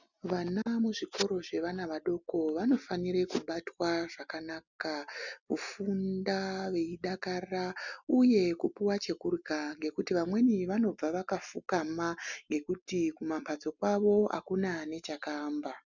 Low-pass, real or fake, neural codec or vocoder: 7.2 kHz; real; none